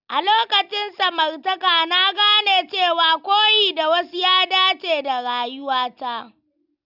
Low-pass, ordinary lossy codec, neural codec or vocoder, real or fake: 5.4 kHz; none; none; real